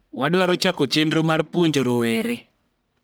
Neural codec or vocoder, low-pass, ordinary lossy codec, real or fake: codec, 44.1 kHz, 1.7 kbps, Pupu-Codec; none; none; fake